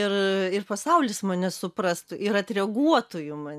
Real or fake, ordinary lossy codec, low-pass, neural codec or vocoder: real; MP3, 96 kbps; 14.4 kHz; none